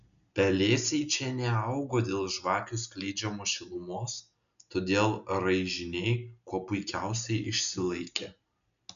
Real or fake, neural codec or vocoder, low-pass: real; none; 7.2 kHz